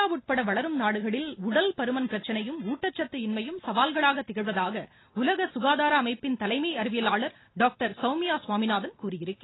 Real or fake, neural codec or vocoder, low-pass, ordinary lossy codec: real; none; 7.2 kHz; AAC, 16 kbps